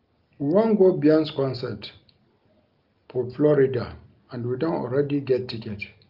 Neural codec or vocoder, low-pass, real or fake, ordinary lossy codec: none; 5.4 kHz; real; Opus, 32 kbps